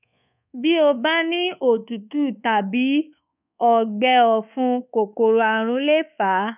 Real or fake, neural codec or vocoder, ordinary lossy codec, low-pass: fake; codec, 24 kHz, 1.2 kbps, DualCodec; none; 3.6 kHz